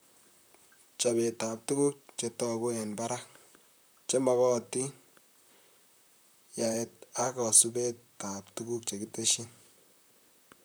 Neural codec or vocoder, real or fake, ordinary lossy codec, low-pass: vocoder, 44.1 kHz, 128 mel bands, Pupu-Vocoder; fake; none; none